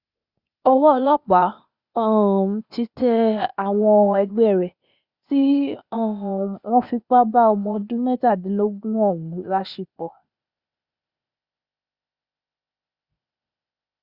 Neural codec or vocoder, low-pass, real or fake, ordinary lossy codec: codec, 16 kHz, 0.8 kbps, ZipCodec; 5.4 kHz; fake; Opus, 64 kbps